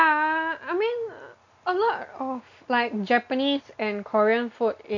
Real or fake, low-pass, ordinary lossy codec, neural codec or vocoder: real; 7.2 kHz; none; none